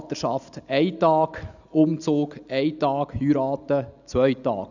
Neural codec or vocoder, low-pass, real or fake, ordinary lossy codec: none; 7.2 kHz; real; none